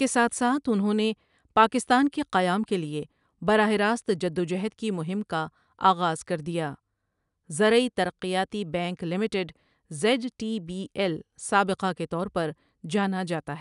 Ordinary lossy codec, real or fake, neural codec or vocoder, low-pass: none; real; none; 10.8 kHz